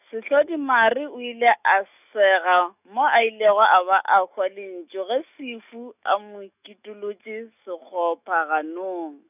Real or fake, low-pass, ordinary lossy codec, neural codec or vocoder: fake; 3.6 kHz; none; autoencoder, 48 kHz, 128 numbers a frame, DAC-VAE, trained on Japanese speech